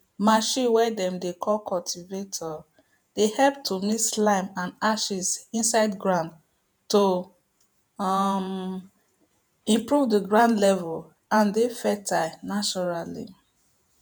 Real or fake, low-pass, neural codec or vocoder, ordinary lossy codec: fake; none; vocoder, 48 kHz, 128 mel bands, Vocos; none